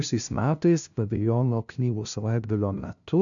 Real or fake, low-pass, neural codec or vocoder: fake; 7.2 kHz; codec, 16 kHz, 0.5 kbps, FunCodec, trained on LibriTTS, 25 frames a second